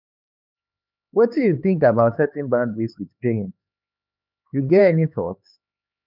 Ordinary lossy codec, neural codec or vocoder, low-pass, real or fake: none; codec, 16 kHz, 4 kbps, X-Codec, HuBERT features, trained on LibriSpeech; 5.4 kHz; fake